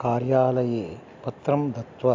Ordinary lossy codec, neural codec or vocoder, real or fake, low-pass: AAC, 48 kbps; none; real; 7.2 kHz